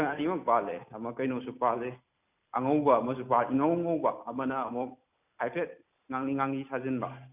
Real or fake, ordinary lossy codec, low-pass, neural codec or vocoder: real; none; 3.6 kHz; none